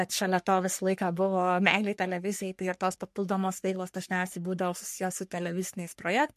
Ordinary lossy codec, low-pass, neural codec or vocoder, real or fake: MP3, 64 kbps; 14.4 kHz; codec, 44.1 kHz, 3.4 kbps, Pupu-Codec; fake